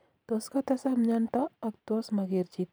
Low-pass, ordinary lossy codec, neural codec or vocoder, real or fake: none; none; vocoder, 44.1 kHz, 128 mel bands every 512 samples, BigVGAN v2; fake